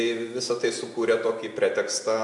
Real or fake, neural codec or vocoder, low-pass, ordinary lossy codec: real; none; 10.8 kHz; MP3, 64 kbps